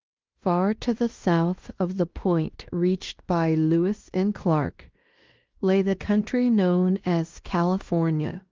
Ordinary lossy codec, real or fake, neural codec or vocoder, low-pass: Opus, 32 kbps; fake; codec, 16 kHz in and 24 kHz out, 0.9 kbps, LongCat-Audio-Codec, fine tuned four codebook decoder; 7.2 kHz